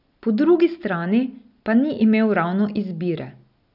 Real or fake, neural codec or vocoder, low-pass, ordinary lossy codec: real; none; 5.4 kHz; none